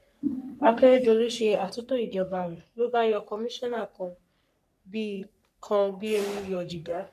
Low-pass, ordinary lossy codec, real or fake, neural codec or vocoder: 14.4 kHz; none; fake; codec, 44.1 kHz, 3.4 kbps, Pupu-Codec